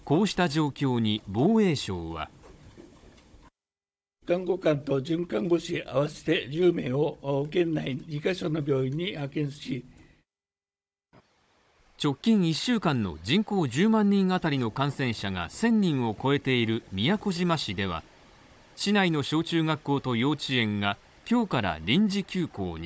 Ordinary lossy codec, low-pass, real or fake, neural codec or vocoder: none; none; fake; codec, 16 kHz, 16 kbps, FunCodec, trained on Chinese and English, 50 frames a second